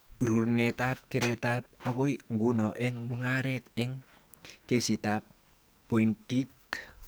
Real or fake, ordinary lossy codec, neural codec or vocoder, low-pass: fake; none; codec, 44.1 kHz, 2.6 kbps, SNAC; none